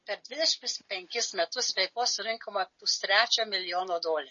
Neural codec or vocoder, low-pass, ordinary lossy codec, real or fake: none; 7.2 kHz; MP3, 32 kbps; real